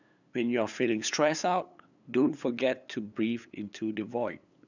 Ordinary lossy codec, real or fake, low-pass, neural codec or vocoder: none; fake; 7.2 kHz; codec, 16 kHz, 8 kbps, FunCodec, trained on LibriTTS, 25 frames a second